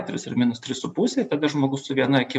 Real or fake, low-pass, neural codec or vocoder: fake; 10.8 kHz; vocoder, 44.1 kHz, 128 mel bands every 256 samples, BigVGAN v2